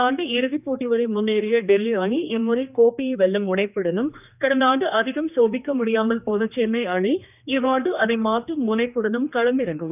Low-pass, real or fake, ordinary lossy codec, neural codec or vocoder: 3.6 kHz; fake; none; codec, 16 kHz, 2 kbps, X-Codec, HuBERT features, trained on general audio